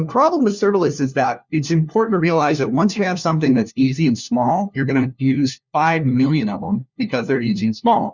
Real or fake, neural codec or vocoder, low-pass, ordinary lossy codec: fake; codec, 16 kHz, 1 kbps, FunCodec, trained on LibriTTS, 50 frames a second; 7.2 kHz; Opus, 64 kbps